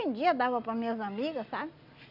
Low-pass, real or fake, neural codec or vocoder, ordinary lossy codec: 5.4 kHz; real; none; none